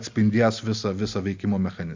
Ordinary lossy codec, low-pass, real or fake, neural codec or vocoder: MP3, 64 kbps; 7.2 kHz; real; none